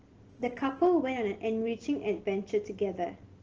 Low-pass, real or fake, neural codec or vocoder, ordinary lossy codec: 7.2 kHz; real; none; Opus, 16 kbps